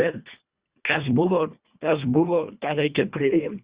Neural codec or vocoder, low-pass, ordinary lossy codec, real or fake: codec, 24 kHz, 1.5 kbps, HILCodec; 3.6 kHz; Opus, 64 kbps; fake